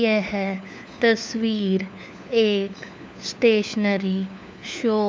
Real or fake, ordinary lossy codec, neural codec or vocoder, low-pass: fake; none; codec, 16 kHz, 4 kbps, FunCodec, trained on LibriTTS, 50 frames a second; none